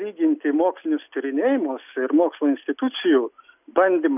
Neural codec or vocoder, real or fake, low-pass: none; real; 3.6 kHz